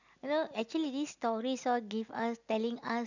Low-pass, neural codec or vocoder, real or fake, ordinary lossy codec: 7.2 kHz; none; real; none